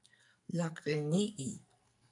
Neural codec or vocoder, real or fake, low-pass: codec, 44.1 kHz, 2.6 kbps, SNAC; fake; 10.8 kHz